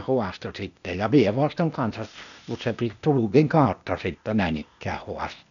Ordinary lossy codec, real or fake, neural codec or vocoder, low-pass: none; fake; codec, 16 kHz, 0.8 kbps, ZipCodec; 7.2 kHz